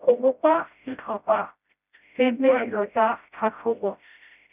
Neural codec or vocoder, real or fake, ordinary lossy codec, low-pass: codec, 16 kHz, 0.5 kbps, FreqCodec, smaller model; fake; none; 3.6 kHz